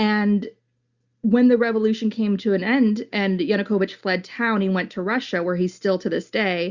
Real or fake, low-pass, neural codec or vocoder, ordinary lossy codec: real; 7.2 kHz; none; Opus, 64 kbps